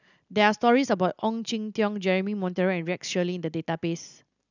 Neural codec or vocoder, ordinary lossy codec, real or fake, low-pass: none; none; real; 7.2 kHz